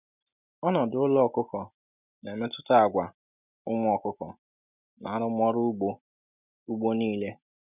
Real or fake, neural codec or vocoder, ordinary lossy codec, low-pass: real; none; none; 3.6 kHz